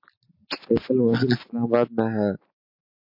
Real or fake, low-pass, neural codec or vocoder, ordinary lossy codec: real; 5.4 kHz; none; MP3, 32 kbps